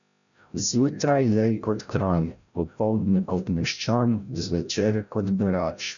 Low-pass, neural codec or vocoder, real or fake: 7.2 kHz; codec, 16 kHz, 0.5 kbps, FreqCodec, larger model; fake